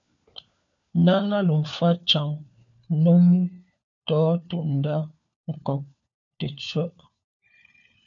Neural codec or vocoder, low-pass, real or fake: codec, 16 kHz, 4 kbps, FunCodec, trained on LibriTTS, 50 frames a second; 7.2 kHz; fake